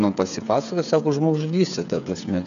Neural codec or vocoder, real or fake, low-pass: codec, 16 kHz, 8 kbps, FreqCodec, smaller model; fake; 7.2 kHz